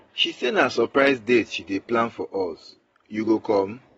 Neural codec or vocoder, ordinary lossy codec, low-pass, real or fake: none; AAC, 24 kbps; 19.8 kHz; real